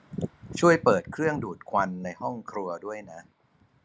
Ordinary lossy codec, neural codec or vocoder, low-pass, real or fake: none; none; none; real